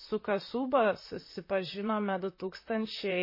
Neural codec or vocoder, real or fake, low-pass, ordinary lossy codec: vocoder, 44.1 kHz, 128 mel bands, Pupu-Vocoder; fake; 5.4 kHz; MP3, 24 kbps